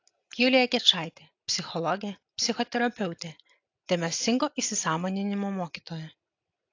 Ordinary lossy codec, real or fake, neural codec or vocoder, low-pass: AAC, 48 kbps; real; none; 7.2 kHz